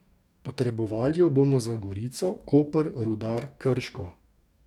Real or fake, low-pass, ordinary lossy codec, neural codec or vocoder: fake; 19.8 kHz; none; codec, 44.1 kHz, 2.6 kbps, DAC